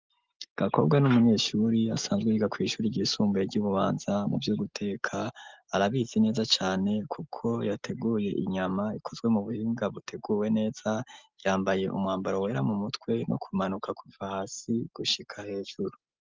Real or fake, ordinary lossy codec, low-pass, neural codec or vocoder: real; Opus, 32 kbps; 7.2 kHz; none